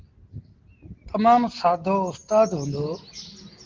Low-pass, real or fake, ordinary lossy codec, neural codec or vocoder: 7.2 kHz; real; Opus, 16 kbps; none